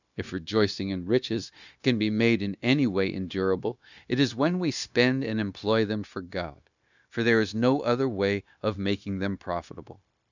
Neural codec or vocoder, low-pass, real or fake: codec, 16 kHz, 0.9 kbps, LongCat-Audio-Codec; 7.2 kHz; fake